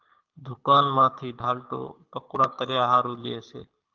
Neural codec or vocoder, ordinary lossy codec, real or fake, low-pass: codec, 24 kHz, 6 kbps, HILCodec; Opus, 32 kbps; fake; 7.2 kHz